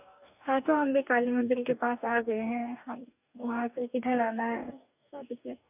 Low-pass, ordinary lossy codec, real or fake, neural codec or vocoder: 3.6 kHz; none; fake; codec, 44.1 kHz, 2.6 kbps, DAC